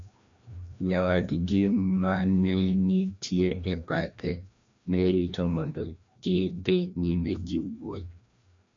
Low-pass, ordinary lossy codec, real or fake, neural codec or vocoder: 7.2 kHz; AAC, 64 kbps; fake; codec, 16 kHz, 1 kbps, FreqCodec, larger model